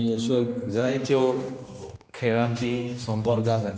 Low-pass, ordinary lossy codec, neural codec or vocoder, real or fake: none; none; codec, 16 kHz, 1 kbps, X-Codec, HuBERT features, trained on general audio; fake